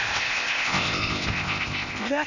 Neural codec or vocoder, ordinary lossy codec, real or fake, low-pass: codec, 16 kHz, 0.8 kbps, ZipCodec; none; fake; 7.2 kHz